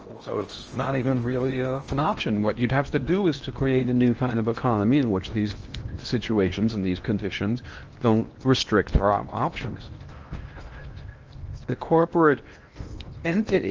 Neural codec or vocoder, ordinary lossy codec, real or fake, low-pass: codec, 16 kHz in and 24 kHz out, 0.8 kbps, FocalCodec, streaming, 65536 codes; Opus, 24 kbps; fake; 7.2 kHz